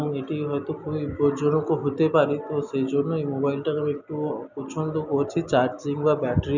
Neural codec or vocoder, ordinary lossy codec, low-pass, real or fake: none; none; 7.2 kHz; real